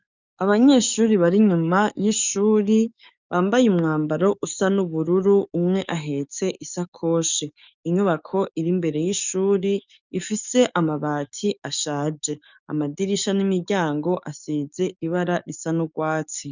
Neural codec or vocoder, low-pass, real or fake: codec, 44.1 kHz, 7.8 kbps, DAC; 7.2 kHz; fake